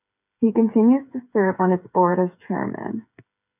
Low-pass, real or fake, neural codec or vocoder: 3.6 kHz; fake; codec, 16 kHz, 8 kbps, FreqCodec, smaller model